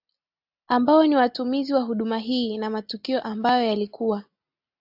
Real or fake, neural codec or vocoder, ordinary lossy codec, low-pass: real; none; AAC, 48 kbps; 5.4 kHz